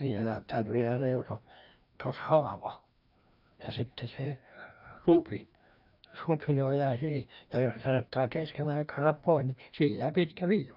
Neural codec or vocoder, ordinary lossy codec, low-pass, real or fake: codec, 16 kHz, 1 kbps, FreqCodec, larger model; none; 5.4 kHz; fake